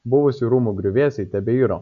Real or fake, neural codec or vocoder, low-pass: real; none; 7.2 kHz